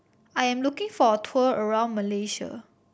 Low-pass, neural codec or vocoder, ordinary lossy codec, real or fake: none; none; none; real